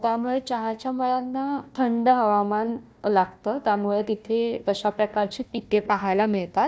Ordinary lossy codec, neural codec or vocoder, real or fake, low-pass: none; codec, 16 kHz, 1 kbps, FunCodec, trained on Chinese and English, 50 frames a second; fake; none